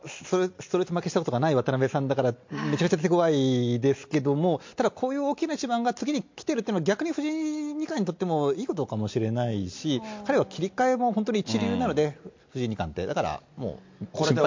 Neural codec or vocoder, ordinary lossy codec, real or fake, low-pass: none; MP3, 48 kbps; real; 7.2 kHz